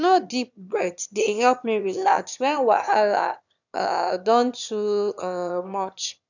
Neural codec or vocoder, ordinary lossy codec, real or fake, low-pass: autoencoder, 22.05 kHz, a latent of 192 numbers a frame, VITS, trained on one speaker; none; fake; 7.2 kHz